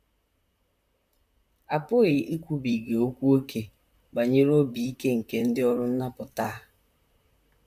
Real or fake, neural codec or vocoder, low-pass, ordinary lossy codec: fake; vocoder, 44.1 kHz, 128 mel bands, Pupu-Vocoder; 14.4 kHz; none